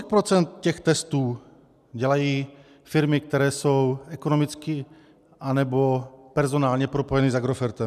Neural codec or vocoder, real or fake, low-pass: none; real; 14.4 kHz